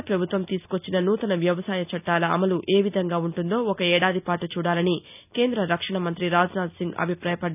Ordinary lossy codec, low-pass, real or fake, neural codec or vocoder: none; 3.6 kHz; real; none